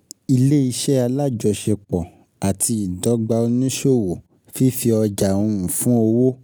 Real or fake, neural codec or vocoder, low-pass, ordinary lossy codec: real; none; none; none